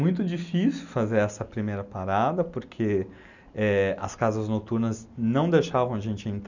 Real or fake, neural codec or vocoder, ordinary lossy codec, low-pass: real; none; none; 7.2 kHz